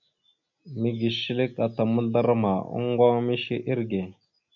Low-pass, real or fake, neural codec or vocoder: 7.2 kHz; real; none